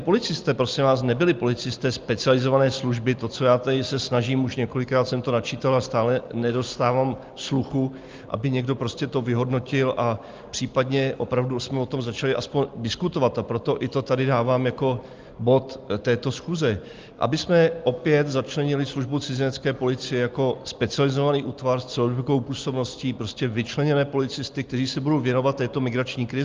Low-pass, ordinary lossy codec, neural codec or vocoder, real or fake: 7.2 kHz; Opus, 32 kbps; none; real